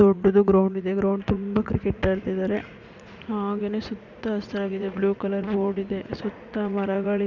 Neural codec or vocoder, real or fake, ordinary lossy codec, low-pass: vocoder, 44.1 kHz, 80 mel bands, Vocos; fake; none; 7.2 kHz